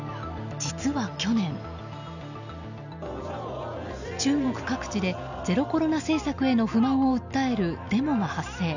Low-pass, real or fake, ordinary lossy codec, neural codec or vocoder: 7.2 kHz; real; none; none